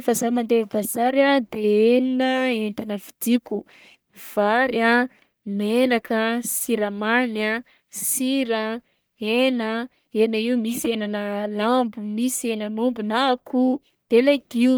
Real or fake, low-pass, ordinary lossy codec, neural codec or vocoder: fake; none; none; codec, 44.1 kHz, 1.7 kbps, Pupu-Codec